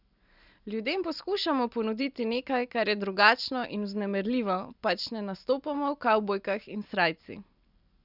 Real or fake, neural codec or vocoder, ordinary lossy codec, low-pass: real; none; none; 5.4 kHz